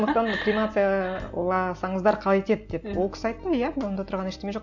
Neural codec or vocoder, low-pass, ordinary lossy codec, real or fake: none; 7.2 kHz; none; real